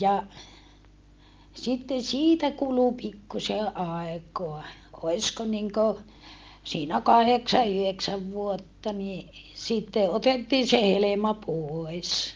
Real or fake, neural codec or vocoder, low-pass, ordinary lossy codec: real; none; 7.2 kHz; Opus, 24 kbps